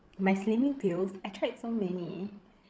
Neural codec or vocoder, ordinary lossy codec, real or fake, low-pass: codec, 16 kHz, 8 kbps, FreqCodec, larger model; none; fake; none